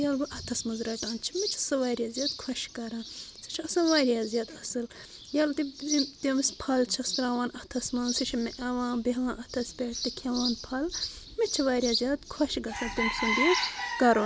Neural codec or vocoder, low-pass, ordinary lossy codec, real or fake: none; none; none; real